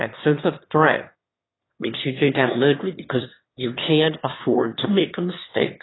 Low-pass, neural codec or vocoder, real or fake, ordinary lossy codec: 7.2 kHz; autoencoder, 22.05 kHz, a latent of 192 numbers a frame, VITS, trained on one speaker; fake; AAC, 16 kbps